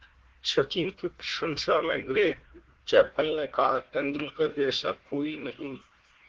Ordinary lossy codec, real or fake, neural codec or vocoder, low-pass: Opus, 16 kbps; fake; codec, 16 kHz, 1 kbps, FunCodec, trained on Chinese and English, 50 frames a second; 7.2 kHz